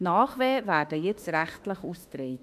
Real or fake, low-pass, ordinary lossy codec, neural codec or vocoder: fake; 14.4 kHz; none; autoencoder, 48 kHz, 32 numbers a frame, DAC-VAE, trained on Japanese speech